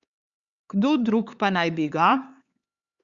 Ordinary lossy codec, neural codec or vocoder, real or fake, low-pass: Opus, 64 kbps; codec, 16 kHz, 4 kbps, X-Codec, HuBERT features, trained on LibriSpeech; fake; 7.2 kHz